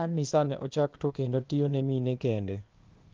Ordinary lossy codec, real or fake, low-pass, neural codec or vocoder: Opus, 32 kbps; fake; 7.2 kHz; codec, 16 kHz, 0.8 kbps, ZipCodec